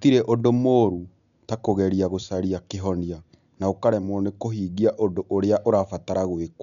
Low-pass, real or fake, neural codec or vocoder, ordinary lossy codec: 7.2 kHz; real; none; none